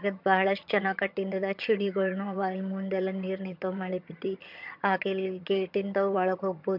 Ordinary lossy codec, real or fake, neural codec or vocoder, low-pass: MP3, 48 kbps; fake; vocoder, 22.05 kHz, 80 mel bands, HiFi-GAN; 5.4 kHz